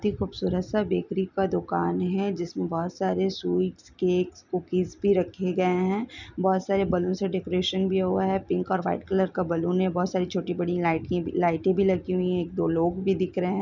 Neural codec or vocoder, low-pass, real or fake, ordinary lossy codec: none; 7.2 kHz; real; none